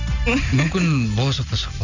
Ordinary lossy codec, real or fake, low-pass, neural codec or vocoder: none; real; 7.2 kHz; none